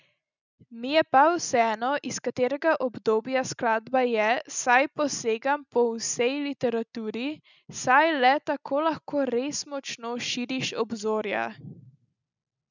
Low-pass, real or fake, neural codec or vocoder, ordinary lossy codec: 7.2 kHz; real; none; none